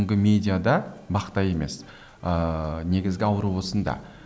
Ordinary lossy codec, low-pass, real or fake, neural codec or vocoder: none; none; real; none